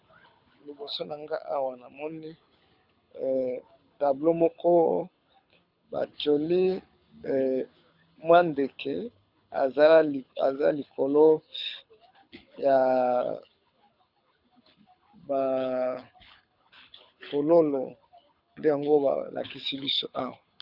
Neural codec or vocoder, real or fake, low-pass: codec, 24 kHz, 6 kbps, HILCodec; fake; 5.4 kHz